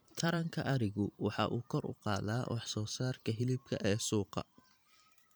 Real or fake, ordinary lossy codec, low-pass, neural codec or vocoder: fake; none; none; vocoder, 44.1 kHz, 128 mel bands every 512 samples, BigVGAN v2